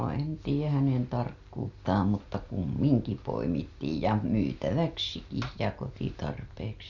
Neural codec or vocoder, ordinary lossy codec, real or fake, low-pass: none; none; real; 7.2 kHz